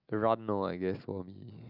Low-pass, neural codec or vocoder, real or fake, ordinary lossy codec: 5.4 kHz; none; real; none